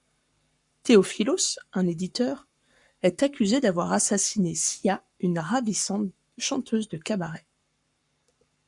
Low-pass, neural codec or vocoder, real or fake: 10.8 kHz; codec, 44.1 kHz, 7.8 kbps, Pupu-Codec; fake